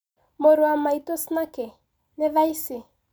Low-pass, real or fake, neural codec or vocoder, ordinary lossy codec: none; real; none; none